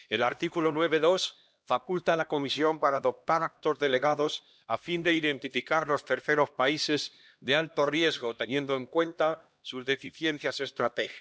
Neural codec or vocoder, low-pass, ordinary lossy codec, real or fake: codec, 16 kHz, 1 kbps, X-Codec, HuBERT features, trained on LibriSpeech; none; none; fake